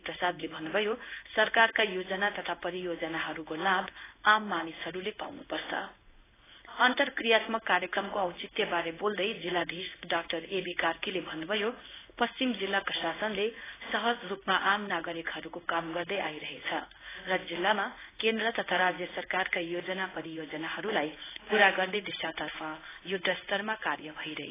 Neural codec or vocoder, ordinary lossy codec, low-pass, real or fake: codec, 16 kHz in and 24 kHz out, 1 kbps, XY-Tokenizer; AAC, 16 kbps; 3.6 kHz; fake